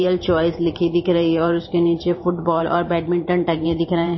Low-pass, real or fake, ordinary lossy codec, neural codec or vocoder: 7.2 kHz; fake; MP3, 24 kbps; vocoder, 44.1 kHz, 128 mel bands every 512 samples, BigVGAN v2